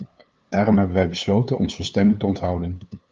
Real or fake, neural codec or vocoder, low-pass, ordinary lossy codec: fake; codec, 16 kHz, 2 kbps, FunCodec, trained on LibriTTS, 25 frames a second; 7.2 kHz; Opus, 24 kbps